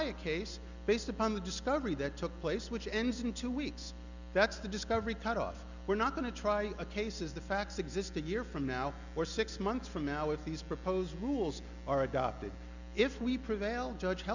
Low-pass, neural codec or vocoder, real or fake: 7.2 kHz; none; real